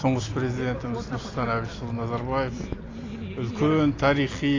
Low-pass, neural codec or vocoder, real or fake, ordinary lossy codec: 7.2 kHz; none; real; AAC, 32 kbps